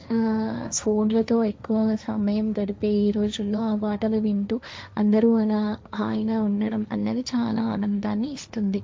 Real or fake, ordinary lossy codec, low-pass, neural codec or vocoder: fake; none; none; codec, 16 kHz, 1.1 kbps, Voila-Tokenizer